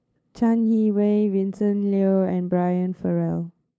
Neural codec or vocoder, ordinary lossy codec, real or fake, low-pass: codec, 16 kHz, 8 kbps, FunCodec, trained on LibriTTS, 25 frames a second; none; fake; none